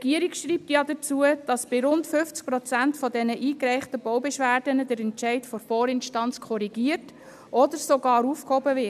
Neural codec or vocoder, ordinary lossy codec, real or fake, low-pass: none; none; real; 14.4 kHz